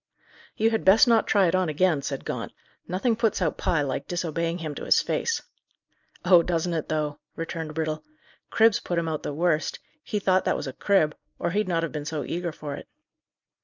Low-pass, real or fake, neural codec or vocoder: 7.2 kHz; real; none